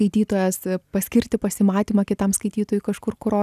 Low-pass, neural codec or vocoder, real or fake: 14.4 kHz; none; real